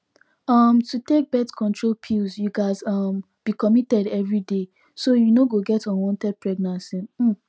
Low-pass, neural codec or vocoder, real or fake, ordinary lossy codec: none; none; real; none